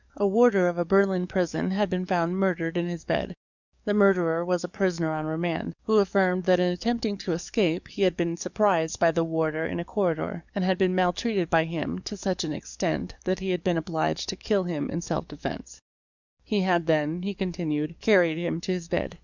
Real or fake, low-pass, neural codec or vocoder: fake; 7.2 kHz; codec, 44.1 kHz, 7.8 kbps, DAC